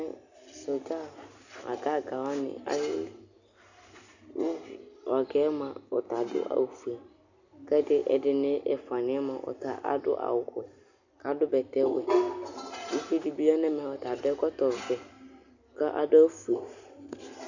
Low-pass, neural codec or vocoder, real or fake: 7.2 kHz; none; real